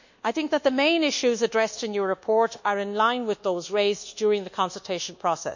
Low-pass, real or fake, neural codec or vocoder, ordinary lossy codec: 7.2 kHz; fake; codec, 24 kHz, 1.2 kbps, DualCodec; none